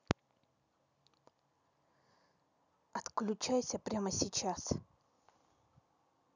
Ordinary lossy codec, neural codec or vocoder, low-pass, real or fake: none; none; 7.2 kHz; real